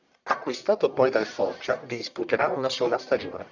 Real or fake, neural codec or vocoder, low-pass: fake; codec, 44.1 kHz, 1.7 kbps, Pupu-Codec; 7.2 kHz